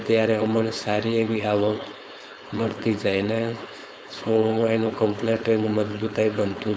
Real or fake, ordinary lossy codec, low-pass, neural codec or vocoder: fake; none; none; codec, 16 kHz, 4.8 kbps, FACodec